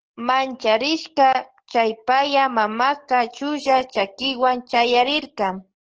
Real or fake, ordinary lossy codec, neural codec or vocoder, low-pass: fake; Opus, 16 kbps; codec, 44.1 kHz, 7.8 kbps, DAC; 7.2 kHz